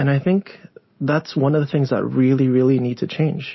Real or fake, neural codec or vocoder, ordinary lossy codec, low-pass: real; none; MP3, 24 kbps; 7.2 kHz